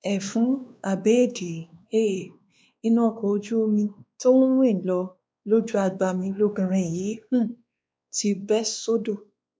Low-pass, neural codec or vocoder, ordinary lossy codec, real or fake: none; codec, 16 kHz, 2 kbps, X-Codec, WavLM features, trained on Multilingual LibriSpeech; none; fake